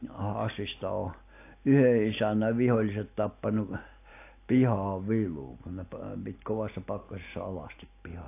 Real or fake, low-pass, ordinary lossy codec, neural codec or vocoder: real; 3.6 kHz; AAC, 24 kbps; none